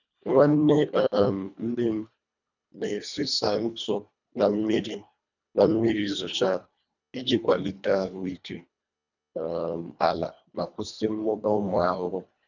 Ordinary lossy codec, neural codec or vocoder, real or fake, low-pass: none; codec, 24 kHz, 1.5 kbps, HILCodec; fake; 7.2 kHz